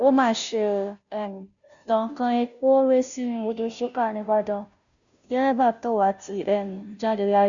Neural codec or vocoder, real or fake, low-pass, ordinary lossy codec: codec, 16 kHz, 0.5 kbps, FunCodec, trained on Chinese and English, 25 frames a second; fake; 7.2 kHz; none